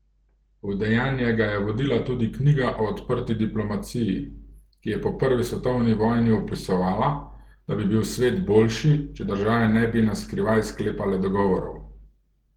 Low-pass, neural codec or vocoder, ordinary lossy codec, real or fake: 19.8 kHz; none; Opus, 16 kbps; real